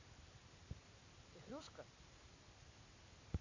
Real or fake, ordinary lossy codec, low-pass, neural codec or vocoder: real; AAC, 32 kbps; 7.2 kHz; none